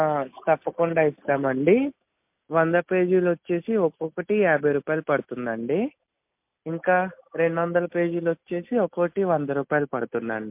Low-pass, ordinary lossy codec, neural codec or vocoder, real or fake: 3.6 kHz; MP3, 32 kbps; none; real